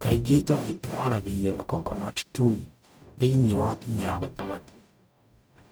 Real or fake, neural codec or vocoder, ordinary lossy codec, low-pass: fake; codec, 44.1 kHz, 0.9 kbps, DAC; none; none